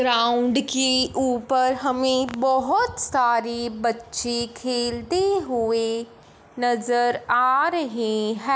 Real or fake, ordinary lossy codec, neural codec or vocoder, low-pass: real; none; none; none